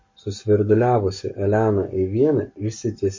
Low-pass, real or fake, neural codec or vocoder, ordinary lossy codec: 7.2 kHz; fake; codec, 44.1 kHz, 7.8 kbps, Pupu-Codec; MP3, 32 kbps